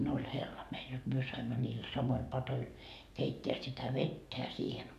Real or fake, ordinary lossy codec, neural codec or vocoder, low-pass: fake; none; codec, 44.1 kHz, 7.8 kbps, DAC; 14.4 kHz